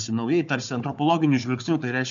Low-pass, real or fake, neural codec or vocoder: 7.2 kHz; fake; codec, 16 kHz, 4 kbps, FunCodec, trained on Chinese and English, 50 frames a second